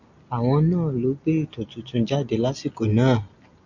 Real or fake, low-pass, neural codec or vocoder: real; 7.2 kHz; none